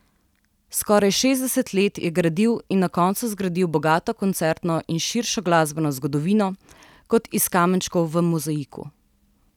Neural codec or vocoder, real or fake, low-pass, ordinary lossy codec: none; real; 19.8 kHz; none